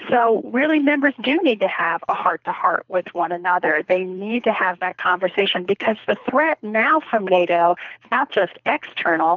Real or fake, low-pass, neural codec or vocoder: fake; 7.2 kHz; codec, 24 kHz, 3 kbps, HILCodec